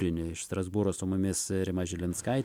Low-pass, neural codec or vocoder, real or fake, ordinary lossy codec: 19.8 kHz; none; real; MP3, 96 kbps